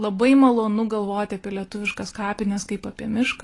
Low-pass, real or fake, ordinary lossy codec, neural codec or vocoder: 10.8 kHz; real; AAC, 32 kbps; none